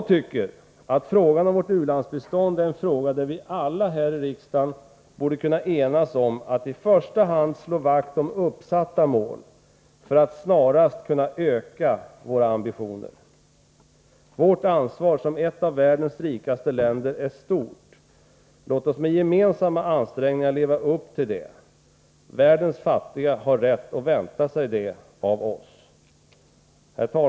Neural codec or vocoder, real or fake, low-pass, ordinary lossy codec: none; real; none; none